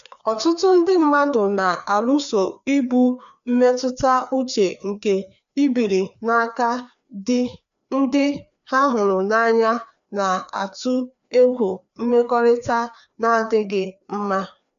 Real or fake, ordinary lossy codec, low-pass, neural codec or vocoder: fake; none; 7.2 kHz; codec, 16 kHz, 2 kbps, FreqCodec, larger model